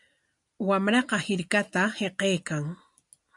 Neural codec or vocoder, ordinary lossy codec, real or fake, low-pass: none; MP3, 96 kbps; real; 10.8 kHz